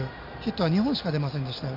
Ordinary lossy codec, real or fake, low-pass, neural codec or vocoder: none; real; 5.4 kHz; none